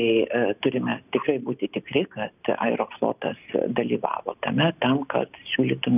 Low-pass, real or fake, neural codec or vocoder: 3.6 kHz; real; none